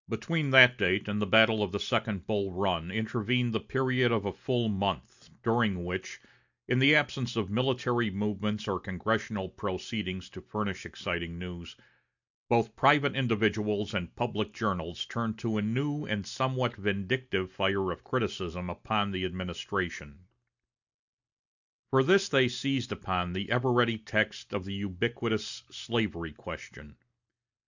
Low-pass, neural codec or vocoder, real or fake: 7.2 kHz; none; real